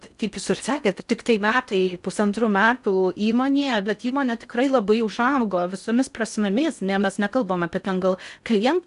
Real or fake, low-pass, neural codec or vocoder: fake; 10.8 kHz; codec, 16 kHz in and 24 kHz out, 0.6 kbps, FocalCodec, streaming, 4096 codes